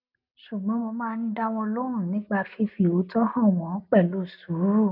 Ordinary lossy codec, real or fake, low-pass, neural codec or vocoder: none; real; 5.4 kHz; none